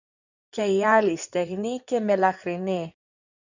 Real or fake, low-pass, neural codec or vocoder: fake; 7.2 kHz; codec, 16 kHz in and 24 kHz out, 2.2 kbps, FireRedTTS-2 codec